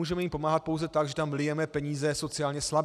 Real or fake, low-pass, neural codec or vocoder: real; 14.4 kHz; none